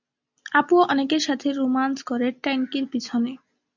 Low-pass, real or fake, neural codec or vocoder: 7.2 kHz; real; none